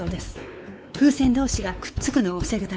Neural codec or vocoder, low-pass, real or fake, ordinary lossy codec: codec, 16 kHz, 4 kbps, X-Codec, WavLM features, trained on Multilingual LibriSpeech; none; fake; none